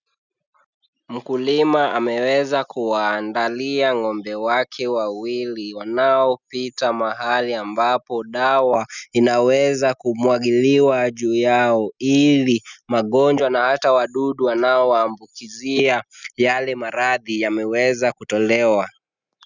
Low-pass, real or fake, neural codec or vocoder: 7.2 kHz; real; none